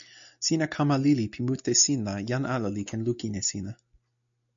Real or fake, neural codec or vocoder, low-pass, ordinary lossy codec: real; none; 7.2 kHz; MP3, 64 kbps